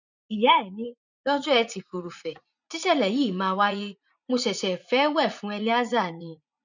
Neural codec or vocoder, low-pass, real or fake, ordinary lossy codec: vocoder, 44.1 kHz, 80 mel bands, Vocos; 7.2 kHz; fake; none